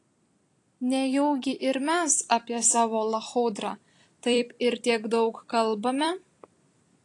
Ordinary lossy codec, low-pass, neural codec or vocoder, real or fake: AAC, 48 kbps; 10.8 kHz; vocoder, 44.1 kHz, 128 mel bands every 256 samples, BigVGAN v2; fake